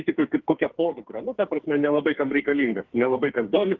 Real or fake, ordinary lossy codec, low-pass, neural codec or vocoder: fake; Opus, 16 kbps; 7.2 kHz; codec, 44.1 kHz, 2.6 kbps, SNAC